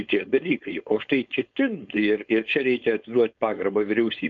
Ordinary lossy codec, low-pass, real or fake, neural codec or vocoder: MP3, 64 kbps; 7.2 kHz; fake; codec, 16 kHz, 4.8 kbps, FACodec